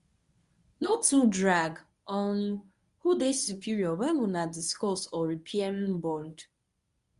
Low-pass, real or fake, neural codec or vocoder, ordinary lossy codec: 10.8 kHz; fake; codec, 24 kHz, 0.9 kbps, WavTokenizer, medium speech release version 1; Opus, 64 kbps